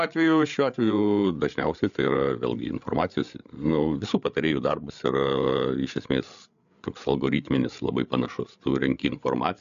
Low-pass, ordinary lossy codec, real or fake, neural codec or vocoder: 7.2 kHz; MP3, 96 kbps; fake; codec, 16 kHz, 8 kbps, FreqCodec, larger model